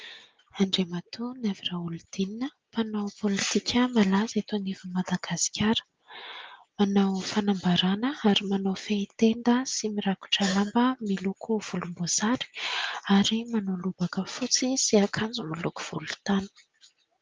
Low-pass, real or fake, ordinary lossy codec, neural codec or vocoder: 7.2 kHz; real; Opus, 24 kbps; none